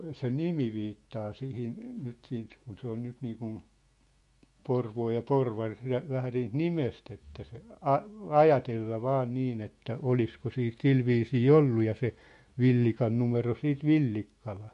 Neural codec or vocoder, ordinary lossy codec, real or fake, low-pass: autoencoder, 48 kHz, 128 numbers a frame, DAC-VAE, trained on Japanese speech; MP3, 48 kbps; fake; 14.4 kHz